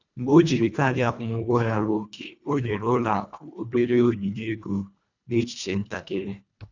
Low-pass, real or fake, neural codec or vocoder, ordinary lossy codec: 7.2 kHz; fake; codec, 24 kHz, 1.5 kbps, HILCodec; none